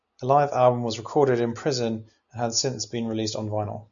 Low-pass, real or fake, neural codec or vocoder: 7.2 kHz; real; none